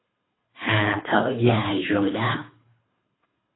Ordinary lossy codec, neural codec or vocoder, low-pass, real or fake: AAC, 16 kbps; codec, 24 kHz, 3 kbps, HILCodec; 7.2 kHz; fake